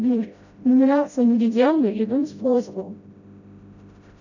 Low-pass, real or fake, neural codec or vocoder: 7.2 kHz; fake; codec, 16 kHz, 0.5 kbps, FreqCodec, smaller model